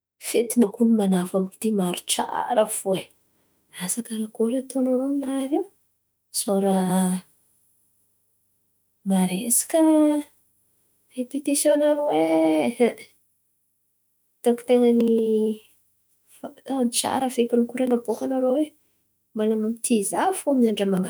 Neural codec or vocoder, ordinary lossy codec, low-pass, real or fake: autoencoder, 48 kHz, 32 numbers a frame, DAC-VAE, trained on Japanese speech; none; none; fake